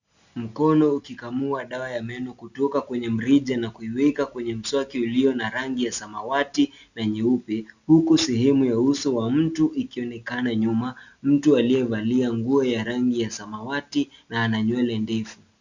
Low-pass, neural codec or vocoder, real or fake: 7.2 kHz; none; real